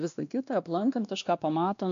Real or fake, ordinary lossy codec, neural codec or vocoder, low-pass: fake; MP3, 64 kbps; codec, 16 kHz, 1 kbps, X-Codec, WavLM features, trained on Multilingual LibriSpeech; 7.2 kHz